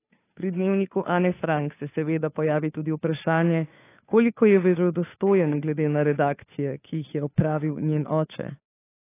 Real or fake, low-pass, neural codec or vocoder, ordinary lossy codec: fake; 3.6 kHz; codec, 16 kHz, 2 kbps, FunCodec, trained on Chinese and English, 25 frames a second; AAC, 24 kbps